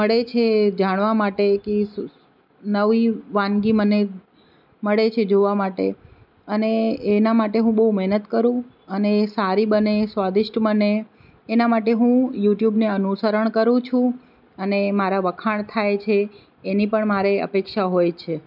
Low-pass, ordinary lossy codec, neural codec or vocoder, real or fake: 5.4 kHz; none; none; real